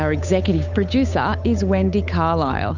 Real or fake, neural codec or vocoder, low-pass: fake; codec, 16 kHz, 8 kbps, FunCodec, trained on Chinese and English, 25 frames a second; 7.2 kHz